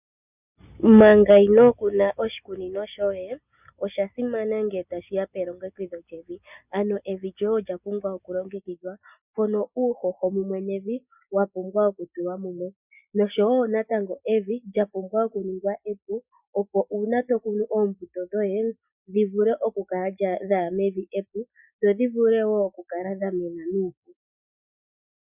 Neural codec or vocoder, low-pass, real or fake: none; 3.6 kHz; real